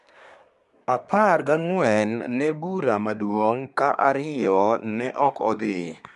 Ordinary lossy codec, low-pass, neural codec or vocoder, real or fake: none; 10.8 kHz; codec, 24 kHz, 1 kbps, SNAC; fake